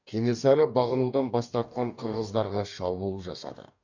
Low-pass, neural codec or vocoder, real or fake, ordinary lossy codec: 7.2 kHz; codec, 44.1 kHz, 2.6 kbps, DAC; fake; none